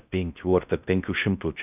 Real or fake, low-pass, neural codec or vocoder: fake; 3.6 kHz; codec, 16 kHz in and 24 kHz out, 0.6 kbps, FocalCodec, streaming, 4096 codes